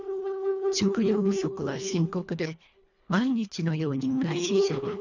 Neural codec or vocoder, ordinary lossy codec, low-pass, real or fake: codec, 24 kHz, 1.5 kbps, HILCodec; none; 7.2 kHz; fake